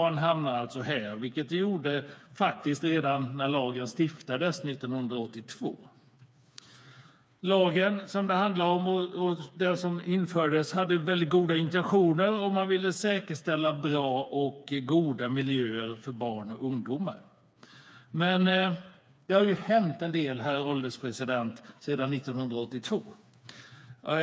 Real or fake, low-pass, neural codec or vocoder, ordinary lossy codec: fake; none; codec, 16 kHz, 4 kbps, FreqCodec, smaller model; none